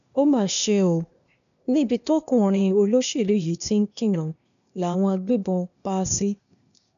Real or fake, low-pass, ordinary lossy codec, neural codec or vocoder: fake; 7.2 kHz; none; codec, 16 kHz, 0.8 kbps, ZipCodec